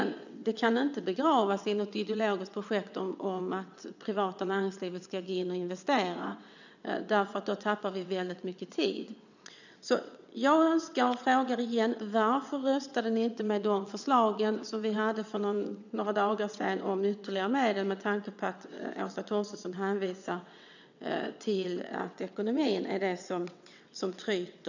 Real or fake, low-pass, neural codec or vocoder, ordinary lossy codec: fake; 7.2 kHz; vocoder, 22.05 kHz, 80 mel bands, WaveNeXt; none